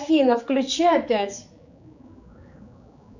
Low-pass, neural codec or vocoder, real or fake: 7.2 kHz; codec, 16 kHz, 4 kbps, X-Codec, HuBERT features, trained on general audio; fake